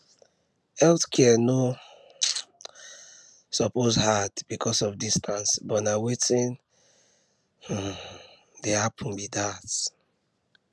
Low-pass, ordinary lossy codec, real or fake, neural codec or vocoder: none; none; real; none